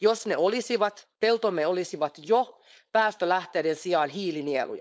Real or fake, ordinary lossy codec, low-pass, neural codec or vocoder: fake; none; none; codec, 16 kHz, 4.8 kbps, FACodec